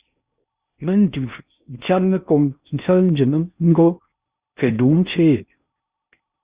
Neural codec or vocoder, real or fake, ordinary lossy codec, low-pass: codec, 16 kHz in and 24 kHz out, 0.6 kbps, FocalCodec, streaming, 4096 codes; fake; Opus, 64 kbps; 3.6 kHz